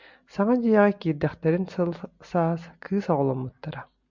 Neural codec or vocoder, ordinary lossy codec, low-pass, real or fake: none; MP3, 64 kbps; 7.2 kHz; real